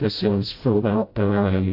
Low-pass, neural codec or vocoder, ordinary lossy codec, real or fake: 5.4 kHz; codec, 16 kHz, 0.5 kbps, FreqCodec, smaller model; AAC, 32 kbps; fake